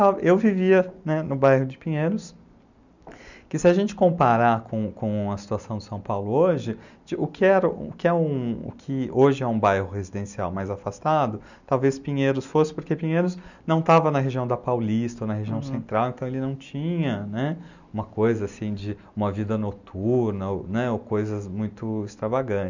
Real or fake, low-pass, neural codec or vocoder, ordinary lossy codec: real; 7.2 kHz; none; none